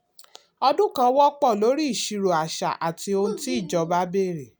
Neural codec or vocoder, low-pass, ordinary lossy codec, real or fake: none; none; none; real